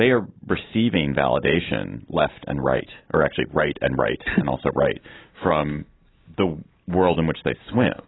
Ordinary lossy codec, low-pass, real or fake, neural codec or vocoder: AAC, 16 kbps; 7.2 kHz; real; none